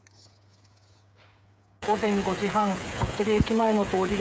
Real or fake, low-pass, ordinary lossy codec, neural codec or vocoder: fake; none; none; codec, 16 kHz, 8 kbps, FreqCodec, smaller model